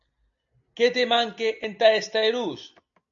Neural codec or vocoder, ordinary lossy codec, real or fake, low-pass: none; AAC, 64 kbps; real; 7.2 kHz